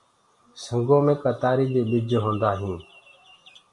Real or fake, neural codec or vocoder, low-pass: real; none; 10.8 kHz